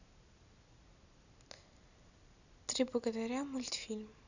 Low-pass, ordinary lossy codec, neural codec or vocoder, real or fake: 7.2 kHz; none; none; real